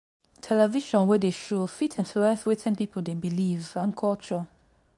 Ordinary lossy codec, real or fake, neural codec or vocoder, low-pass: none; fake; codec, 24 kHz, 0.9 kbps, WavTokenizer, medium speech release version 2; 10.8 kHz